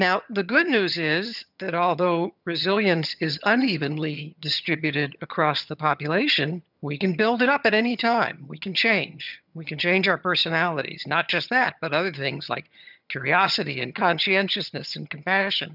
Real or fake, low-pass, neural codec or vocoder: fake; 5.4 kHz; vocoder, 22.05 kHz, 80 mel bands, HiFi-GAN